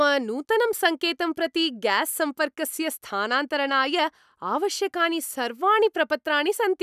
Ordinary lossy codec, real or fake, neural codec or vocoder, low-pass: none; real; none; 14.4 kHz